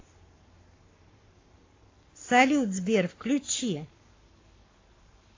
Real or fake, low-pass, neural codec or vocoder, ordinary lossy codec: fake; 7.2 kHz; codec, 44.1 kHz, 7.8 kbps, Pupu-Codec; AAC, 32 kbps